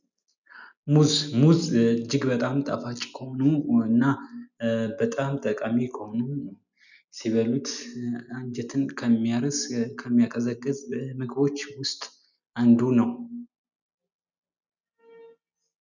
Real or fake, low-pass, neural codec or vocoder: real; 7.2 kHz; none